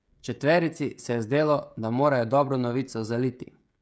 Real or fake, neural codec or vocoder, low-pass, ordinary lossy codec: fake; codec, 16 kHz, 16 kbps, FreqCodec, smaller model; none; none